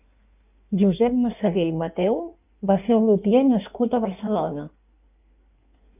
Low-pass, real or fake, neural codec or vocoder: 3.6 kHz; fake; codec, 16 kHz in and 24 kHz out, 1.1 kbps, FireRedTTS-2 codec